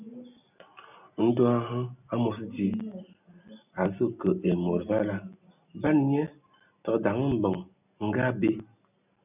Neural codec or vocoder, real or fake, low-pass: none; real; 3.6 kHz